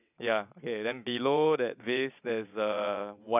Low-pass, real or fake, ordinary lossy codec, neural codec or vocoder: 3.6 kHz; fake; none; vocoder, 22.05 kHz, 80 mel bands, WaveNeXt